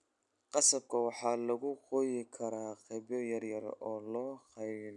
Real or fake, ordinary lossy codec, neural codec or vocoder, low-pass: real; none; none; 10.8 kHz